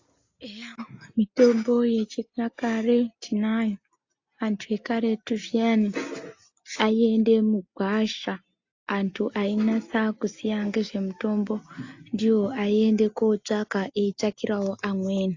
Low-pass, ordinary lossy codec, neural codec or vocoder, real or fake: 7.2 kHz; AAC, 48 kbps; none; real